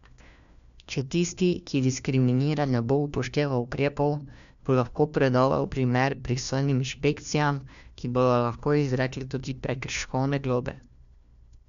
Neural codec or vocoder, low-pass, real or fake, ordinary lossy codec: codec, 16 kHz, 1 kbps, FunCodec, trained on LibriTTS, 50 frames a second; 7.2 kHz; fake; none